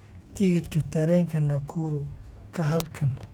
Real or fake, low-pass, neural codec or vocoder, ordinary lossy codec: fake; 19.8 kHz; codec, 44.1 kHz, 2.6 kbps, DAC; none